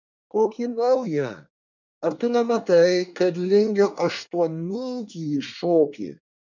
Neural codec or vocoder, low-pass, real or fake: codec, 24 kHz, 1 kbps, SNAC; 7.2 kHz; fake